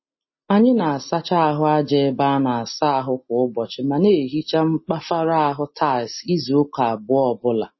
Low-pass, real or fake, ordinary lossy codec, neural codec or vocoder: 7.2 kHz; real; MP3, 24 kbps; none